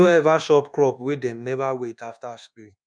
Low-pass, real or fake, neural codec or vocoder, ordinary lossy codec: 9.9 kHz; fake; codec, 24 kHz, 1.2 kbps, DualCodec; none